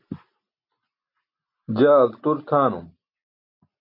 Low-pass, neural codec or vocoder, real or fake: 5.4 kHz; none; real